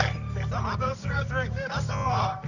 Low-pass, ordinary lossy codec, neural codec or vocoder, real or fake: 7.2 kHz; none; codec, 24 kHz, 0.9 kbps, WavTokenizer, medium music audio release; fake